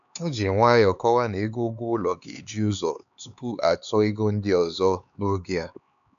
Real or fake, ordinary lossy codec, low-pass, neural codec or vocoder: fake; none; 7.2 kHz; codec, 16 kHz, 4 kbps, X-Codec, HuBERT features, trained on LibriSpeech